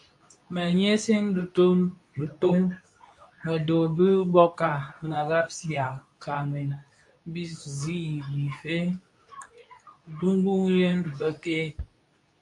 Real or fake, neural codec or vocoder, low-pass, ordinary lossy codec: fake; codec, 24 kHz, 0.9 kbps, WavTokenizer, medium speech release version 2; 10.8 kHz; AAC, 64 kbps